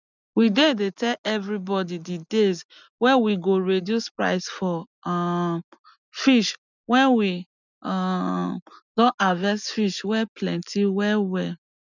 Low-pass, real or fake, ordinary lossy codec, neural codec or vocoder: 7.2 kHz; real; none; none